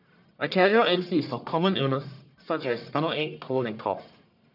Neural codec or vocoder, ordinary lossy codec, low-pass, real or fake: codec, 44.1 kHz, 1.7 kbps, Pupu-Codec; none; 5.4 kHz; fake